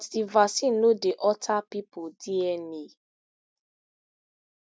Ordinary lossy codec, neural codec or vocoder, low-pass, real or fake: none; none; none; real